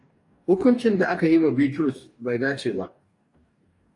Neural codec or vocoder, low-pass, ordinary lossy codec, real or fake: codec, 44.1 kHz, 2.6 kbps, DAC; 10.8 kHz; AAC, 48 kbps; fake